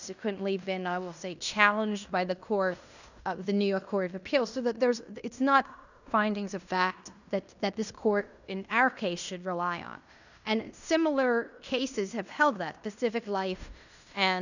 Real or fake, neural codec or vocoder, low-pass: fake; codec, 16 kHz in and 24 kHz out, 0.9 kbps, LongCat-Audio-Codec, fine tuned four codebook decoder; 7.2 kHz